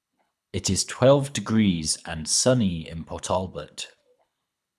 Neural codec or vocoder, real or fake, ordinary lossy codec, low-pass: codec, 24 kHz, 6 kbps, HILCodec; fake; none; none